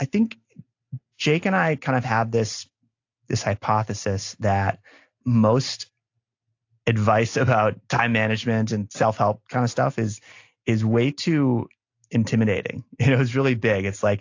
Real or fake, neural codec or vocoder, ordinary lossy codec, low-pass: real; none; AAC, 48 kbps; 7.2 kHz